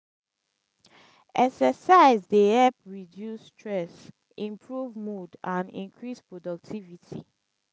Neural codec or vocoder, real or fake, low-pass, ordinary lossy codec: none; real; none; none